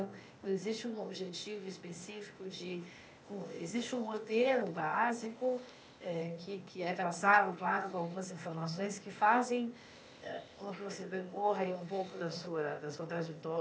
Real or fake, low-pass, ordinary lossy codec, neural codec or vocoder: fake; none; none; codec, 16 kHz, 0.8 kbps, ZipCodec